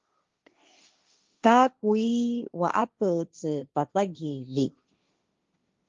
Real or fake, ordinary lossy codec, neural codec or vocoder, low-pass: fake; Opus, 32 kbps; codec, 16 kHz, 1.1 kbps, Voila-Tokenizer; 7.2 kHz